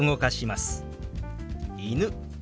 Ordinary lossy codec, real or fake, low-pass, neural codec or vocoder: none; real; none; none